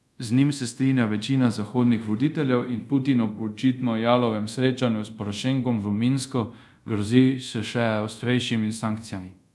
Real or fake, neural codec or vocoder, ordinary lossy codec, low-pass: fake; codec, 24 kHz, 0.5 kbps, DualCodec; none; none